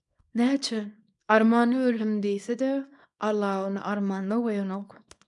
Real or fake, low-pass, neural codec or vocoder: fake; 10.8 kHz; codec, 24 kHz, 0.9 kbps, WavTokenizer, small release